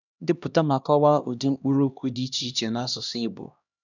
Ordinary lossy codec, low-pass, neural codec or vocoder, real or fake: none; 7.2 kHz; codec, 16 kHz, 1 kbps, X-Codec, HuBERT features, trained on LibriSpeech; fake